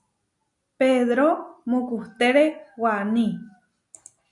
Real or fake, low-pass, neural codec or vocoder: real; 10.8 kHz; none